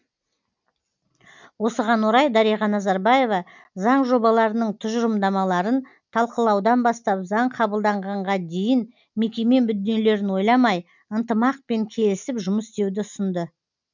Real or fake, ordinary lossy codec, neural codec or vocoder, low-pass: real; none; none; 7.2 kHz